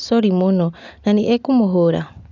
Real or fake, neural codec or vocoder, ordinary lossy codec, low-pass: real; none; none; 7.2 kHz